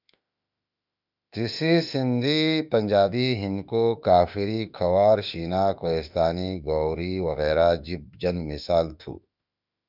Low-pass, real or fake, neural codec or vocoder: 5.4 kHz; fake; autoencoder, 48 kHz, 32 numbers a frame, DAC-VAE, trained on Japanese speech